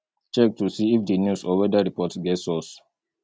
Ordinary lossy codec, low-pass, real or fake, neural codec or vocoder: none; none; real; none